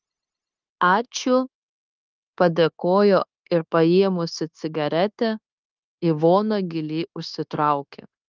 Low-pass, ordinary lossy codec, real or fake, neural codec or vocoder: 7.2 kHz; Opus, 24 kbps; fake; codec, 16 kHz, 0.9 kbps, LongCat-Audio-Codec